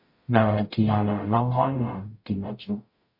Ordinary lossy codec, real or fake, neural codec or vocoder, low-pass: MP3, 32 kbps; fake; codec, 44.1 kHz, 0.9 kbps, DAC; 5.4 kHz